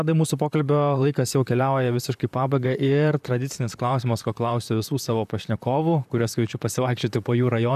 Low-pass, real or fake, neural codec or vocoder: 14.4 kHz; fake; vocoder, 44.1 kHz, 128 mel bands, Pupu-Vocoder